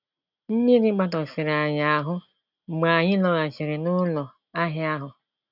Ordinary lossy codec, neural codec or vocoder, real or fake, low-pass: none; none; real; 5.4 kHz